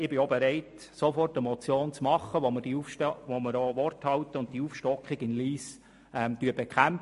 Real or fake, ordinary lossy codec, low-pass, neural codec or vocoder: real; MP3, 48 kbps; 14.4 kHz; none